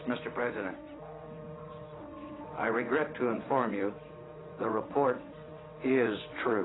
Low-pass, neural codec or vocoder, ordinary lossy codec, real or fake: 7.2 kHz; vocoder, 22.05 kHz, 80 mel bands, WaveNeXt; AAC, 16 kbps; fake